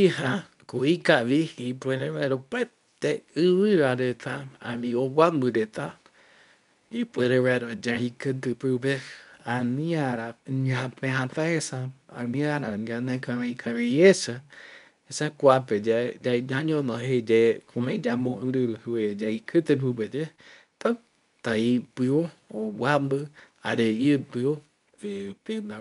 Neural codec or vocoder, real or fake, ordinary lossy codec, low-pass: codec, 24 kHz, 0.9 kbps, WavTokenizer, medium speech release version 2; fake; none; 10.8 kHz